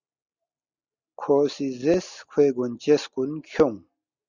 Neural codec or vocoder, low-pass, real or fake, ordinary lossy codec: none; 7.2 kHz; real; Opus, 64 kbps